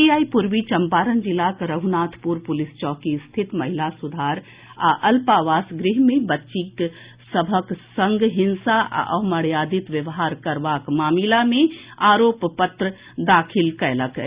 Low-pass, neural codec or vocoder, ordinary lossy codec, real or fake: 3.6 kHz; none; Opus, 64 kbps; real